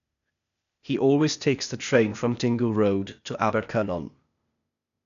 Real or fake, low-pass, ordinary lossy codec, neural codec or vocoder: fake; 7.2 kHz; none; codec, 16 kHz, 0.8 kbps, ZipCodec